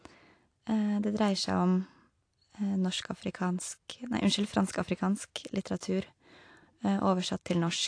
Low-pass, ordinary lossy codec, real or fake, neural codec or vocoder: 9.9 kHz; AAC, 48 kbps; real; none